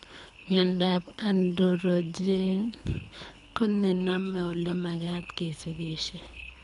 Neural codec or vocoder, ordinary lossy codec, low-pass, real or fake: codec, 24 kHz, 3 kbps, HILCodec; none; 10.8 kHz; fake